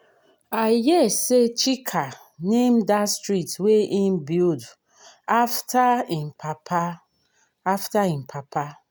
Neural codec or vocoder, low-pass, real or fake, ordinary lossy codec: none; none; real; none